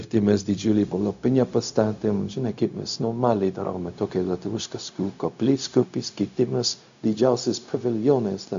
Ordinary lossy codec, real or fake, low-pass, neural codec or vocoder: MP3, 64 kbps; fake; 7.2 kHz; codec, 16 kHz, 0.4 kbps, LongCat-Audio-Codec